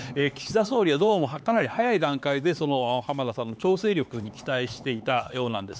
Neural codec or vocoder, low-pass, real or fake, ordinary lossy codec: codec, 16 kHz, 4 kbps, X-Codec, HuBERT features, trained on LibriSpeech; none; fake; none